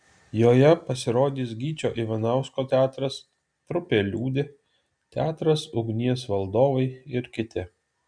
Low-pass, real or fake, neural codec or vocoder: 9.9 kHz; real; none